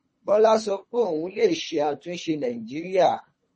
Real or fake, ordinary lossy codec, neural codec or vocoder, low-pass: fake; MP3, 32 kbps; codec, 24 kHz, 3 kbps, HILCodec; 10.8 kHz